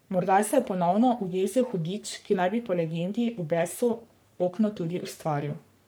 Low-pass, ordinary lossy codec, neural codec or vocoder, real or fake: none; none; codec, 44.1 kHz, 3.4 kbps, Pupu-Codec; fake